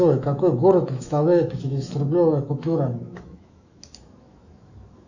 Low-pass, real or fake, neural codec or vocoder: 7.2 kHz; fake; autoencoder, 48 kHz, 128 numbers a frame, DAC-VAE, trained on Japanese speech